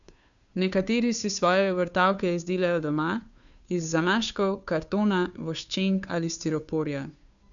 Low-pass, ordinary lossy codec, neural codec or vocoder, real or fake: 7.2 kHz; none; codec, 16 kHz, 2 kbps, FunCodec, trained on Chinese and English, 25 frames a second; fake